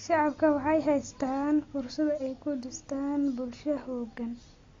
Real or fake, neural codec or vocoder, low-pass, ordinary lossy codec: real; none; 7.2 kHz; AAC, 32 kbps